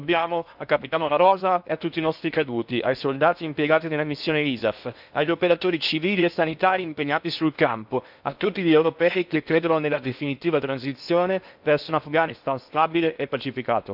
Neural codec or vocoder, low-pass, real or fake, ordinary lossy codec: codec, 16 kHz in and 24 kHz out, 0.8 kbps, FocalCodec, streaming, 65536 codes; 5.4 kHz; fake; none